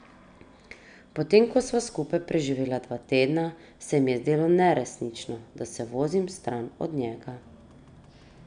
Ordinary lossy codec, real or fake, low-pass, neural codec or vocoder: none; real; 9.9 kHz; none